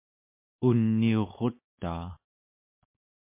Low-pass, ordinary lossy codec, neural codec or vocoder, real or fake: 3.6 kHz; MP3, 24 kbps; autoencoder, 48 kHz, 128 numbers a frame, DAC-VAE, trained on Japanese speech; fake